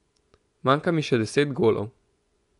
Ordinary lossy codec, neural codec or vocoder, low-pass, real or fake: none; vocoder, 24 kHz, 100 mel bands, Vocos; 10.8 kHz; fake